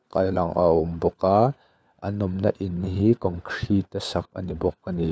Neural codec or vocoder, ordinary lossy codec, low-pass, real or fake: codec, 16 kHz, 4 kbps, FunCodec, trained on LibriTTS, 50 frames a second; none; none; fake